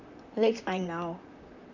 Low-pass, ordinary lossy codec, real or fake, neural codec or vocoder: 7.2 kHz; none; fake; vocoder, 44.1 kHz, 128 mel bands, Pupu-Vocoder